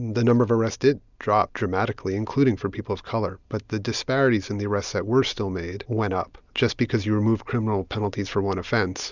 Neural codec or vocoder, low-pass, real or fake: none; 7.2 kHz; real